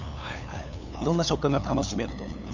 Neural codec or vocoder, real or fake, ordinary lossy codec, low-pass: codec, 16 kHz, 8 kbps, FunCodec, trained on LibriTTS, 25 frames a second; fake; none; 7.2 kHz